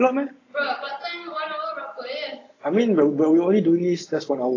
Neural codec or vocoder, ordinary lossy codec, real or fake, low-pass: none; AAC, 32 kbps; real; 7.2 kHz